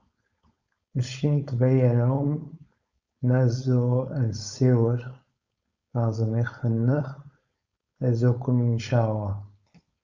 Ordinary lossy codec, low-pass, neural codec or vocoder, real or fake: Opus, 64 kbps; 7.2 kHz; codec, 16 kHz, 4.8 kbps, FACodec; fake